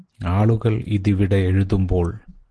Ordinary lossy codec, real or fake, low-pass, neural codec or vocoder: Opus, 16 kbps; real; 10.8 kHz; none